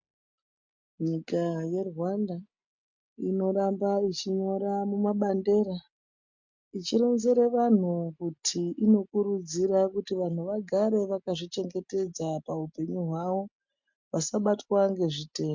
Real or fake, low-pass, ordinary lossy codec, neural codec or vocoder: real; 7.2 kHz; MP3, 64 kbps; none